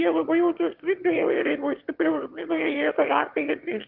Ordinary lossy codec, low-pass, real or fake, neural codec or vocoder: Opus, 32 kbps; 5.4 kHz; fake; autoencoder, 22.05 kHz, a latent of 192 numbers a frame, VITS, trained on one speaker